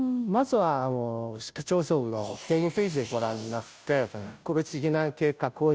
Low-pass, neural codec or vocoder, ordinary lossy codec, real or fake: none; codec, 16 kHz, 0.5 kbps, FunCodec, trained on Chinese and English, 25 frames a second; none; fake